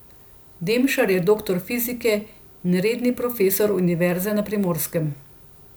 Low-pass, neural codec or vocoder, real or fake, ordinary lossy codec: none; none; real; none